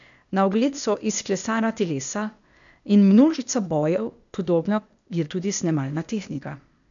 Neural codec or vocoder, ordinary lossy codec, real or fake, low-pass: codec, 16 kHz, 0.8 kbps, ZipCodec; none; fake; 7.2 kHz